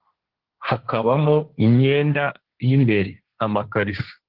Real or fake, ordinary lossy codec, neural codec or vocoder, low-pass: fake; Opus, 16 kbps; codec, 16 kHz, 1.1 kbps, Voila-Tokenizer; 5.4 kHz